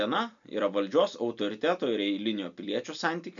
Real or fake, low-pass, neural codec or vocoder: real; 7.2 kHz; none